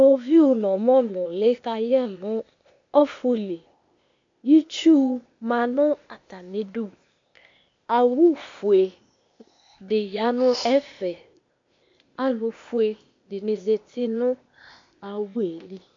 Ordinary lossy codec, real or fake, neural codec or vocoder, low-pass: MP3, 48 kbps; fake; codec, 16 kHz, 0.8 kbps, ZipCodec; 7.2 kHz